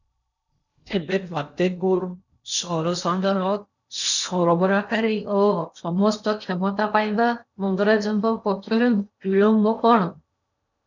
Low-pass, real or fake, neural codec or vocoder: 7.2 kHz; fake; codec, 16 kHz in and 24 kHz out, 0.8 kbps, FocalCodec, streaming, 65536 codes